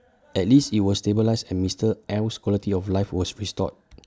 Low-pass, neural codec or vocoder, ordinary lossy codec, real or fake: none; none; none; real